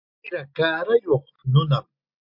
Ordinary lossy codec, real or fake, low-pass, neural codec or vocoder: MP3, 48 kbps; real; 5.4 kHz; none